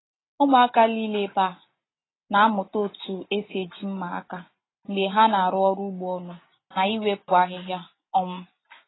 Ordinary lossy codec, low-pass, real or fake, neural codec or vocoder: AAC, 16 kbps; 7.2 kHz; real; none